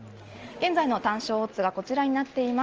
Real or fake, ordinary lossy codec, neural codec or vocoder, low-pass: real; Opus, 24 kbps; none; 7.2 kHz